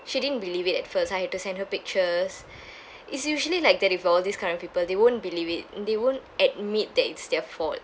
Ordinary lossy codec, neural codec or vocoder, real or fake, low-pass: none; none; real; none